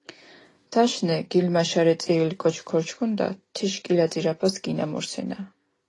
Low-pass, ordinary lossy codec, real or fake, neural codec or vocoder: 10.8 kHz; AAC, 32 kbps; real; none